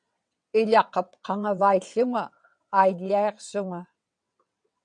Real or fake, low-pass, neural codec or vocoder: fake; 9.9 kHz; vocoder, 22.05 kHz, 80 mel bands, WaveNeXt